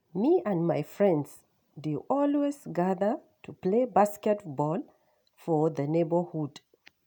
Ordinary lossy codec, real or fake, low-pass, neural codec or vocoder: none; real; none; none